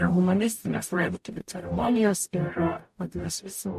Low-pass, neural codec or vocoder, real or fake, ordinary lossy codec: 14.4 kHz; codec, 44.1 kHz, 0.9 kbps, DAC; fake; MP3, 64 kbps